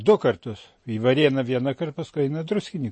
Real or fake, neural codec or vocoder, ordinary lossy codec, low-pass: real; none; MP3, 32 kbps; 9.9 kHz